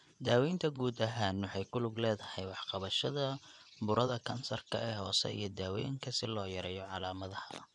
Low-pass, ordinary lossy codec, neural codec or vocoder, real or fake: 10.8 kHz; none; vocoder, 24 kHz, 100 mel bands, Vocos; fake